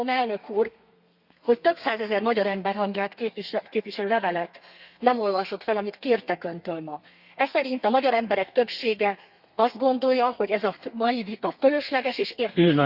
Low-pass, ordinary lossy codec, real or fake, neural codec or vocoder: 5.4 kHz; Opus, 64 kbps; fake; codec, 32 kHz, 1.9 kbps, SNAC